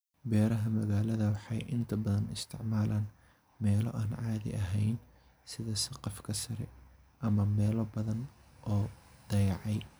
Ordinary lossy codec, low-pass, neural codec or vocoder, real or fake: none; none; none; real